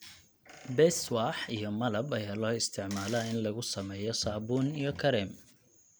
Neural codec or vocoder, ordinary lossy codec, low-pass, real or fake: none; none; none; real